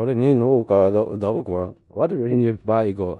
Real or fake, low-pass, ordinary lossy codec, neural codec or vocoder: fake; 10.8 kHz; none; codec, 16 kHz in and 24 kHz out, 0.4 kbps, LongCat-Audio-Codec, four codebook decoder